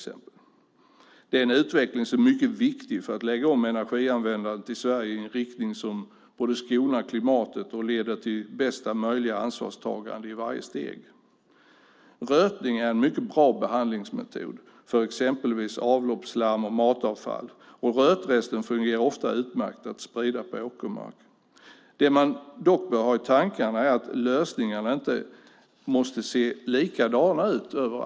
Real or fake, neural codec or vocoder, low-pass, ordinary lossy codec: real; none; none; none